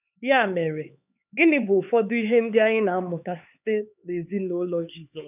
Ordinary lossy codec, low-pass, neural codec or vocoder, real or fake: none; 3.6 kHz; codec, 16 kHz, 4 kbps, X-Codec, HuBERT features, trained on LibriSpeech; fake